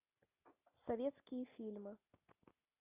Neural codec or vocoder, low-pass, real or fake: none; 3.6 kHz; real